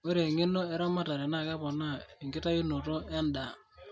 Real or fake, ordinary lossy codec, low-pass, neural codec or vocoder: real; none; none; none